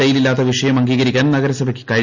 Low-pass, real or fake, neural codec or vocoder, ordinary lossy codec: 7.2 kHz; real; none; none